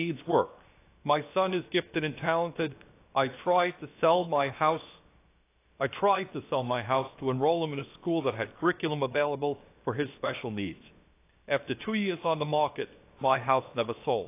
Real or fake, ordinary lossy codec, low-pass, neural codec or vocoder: fake; AAC, 24 kbps; 3.6 kHz; codec, 16 kHz, 0.7 kbps, FocalCodec